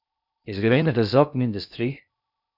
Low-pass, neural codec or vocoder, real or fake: 5.4 kHz; codec, 16 kHz in and 24 kHz out, 0.6 kbps, FocalCodec, streaming, 2048 codes; fake